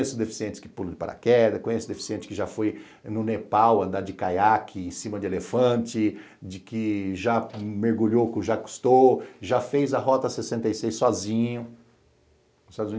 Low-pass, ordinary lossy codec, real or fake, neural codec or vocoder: none; none; real; none